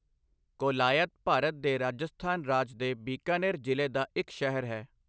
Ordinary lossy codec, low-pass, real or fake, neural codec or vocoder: none; none; real; none